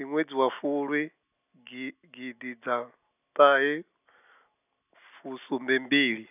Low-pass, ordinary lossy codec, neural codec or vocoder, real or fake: 3.6 kHz; none; none; real